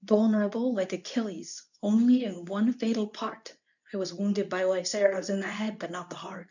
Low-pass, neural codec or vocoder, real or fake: 7.2 kHz; codec, 24 kHz, 0.9 kbps, WavTokenizer, medium speech release version 2; fake